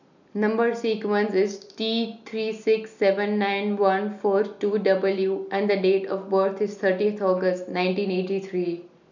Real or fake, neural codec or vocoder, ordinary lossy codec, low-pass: real; none; none; 7.2 kHz